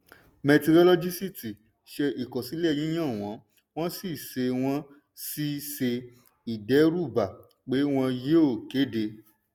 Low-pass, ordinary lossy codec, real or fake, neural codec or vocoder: none; none; real; none